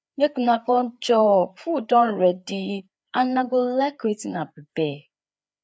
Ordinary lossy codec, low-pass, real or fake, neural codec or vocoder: none; none; fake; codec, 16 kHz, 4 kbps, FreqCodec, larger model